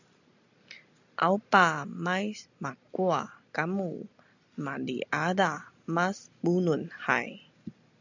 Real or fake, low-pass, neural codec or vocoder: real; 7.2 kHz; none